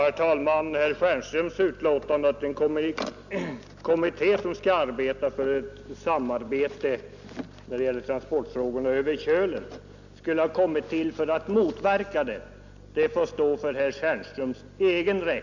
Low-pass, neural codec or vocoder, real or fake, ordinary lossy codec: 7.2 kHz; none; real; none